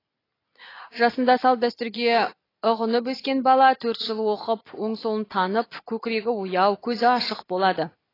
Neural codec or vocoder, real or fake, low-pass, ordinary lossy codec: none; real; 5.4 kHz; AAC, 24 kbps